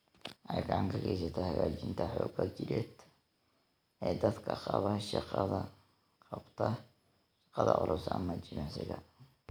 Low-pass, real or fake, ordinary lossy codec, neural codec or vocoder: none; real; none; none